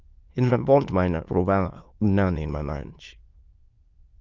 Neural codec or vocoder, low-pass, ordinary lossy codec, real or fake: autoencoder, 22.05 kHz, a latent of 192 numbers a frame, VITS, trained on many speakers; 7.2 kHz; Opus, 24 kbps; fake